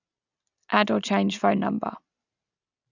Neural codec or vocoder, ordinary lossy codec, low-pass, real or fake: none; none; 7.2 kHz; real